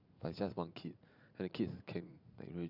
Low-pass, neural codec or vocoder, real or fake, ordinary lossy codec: 5.4 kHz; vocoder, 44.1 kHz, 80 mel bands, Vocos; fake; none